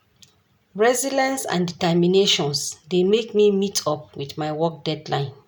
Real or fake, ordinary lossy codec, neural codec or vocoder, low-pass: real; none; none; none